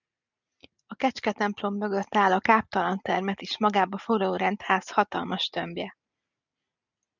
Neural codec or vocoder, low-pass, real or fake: none; 7.2 kHz; real